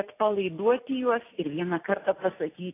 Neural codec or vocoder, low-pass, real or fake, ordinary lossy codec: none; 3.6 kHz; real; AAC, 24 kbps